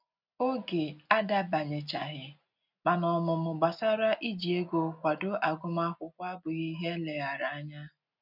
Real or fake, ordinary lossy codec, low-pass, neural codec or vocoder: real; none; 5.4 kHz; none